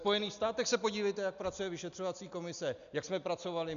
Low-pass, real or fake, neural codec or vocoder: 7.2 kHz; real; none